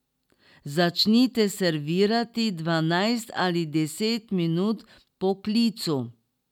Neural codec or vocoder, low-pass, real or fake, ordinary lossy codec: none; 19.8 kHz; real; none